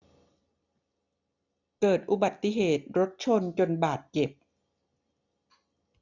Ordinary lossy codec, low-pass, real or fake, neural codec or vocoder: none; 7.2 kHz; real; none